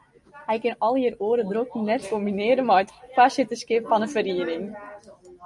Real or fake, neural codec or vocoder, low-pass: real; none; 10.8 kHz